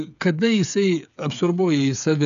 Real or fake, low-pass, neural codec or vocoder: fake; 7.2 kHz; codec, 16 kHz, 8 kbps, FreqCodec, smaller model